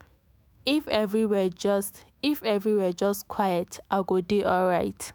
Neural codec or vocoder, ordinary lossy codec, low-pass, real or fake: autoencoder, 48 kHz, 128 numbers a frame, DAC-VAE, trained on Japanese speech; none; none; fake